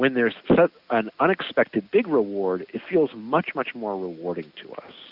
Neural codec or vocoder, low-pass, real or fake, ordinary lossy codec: none; 5.4 kHz; real; Opus, 64 kbps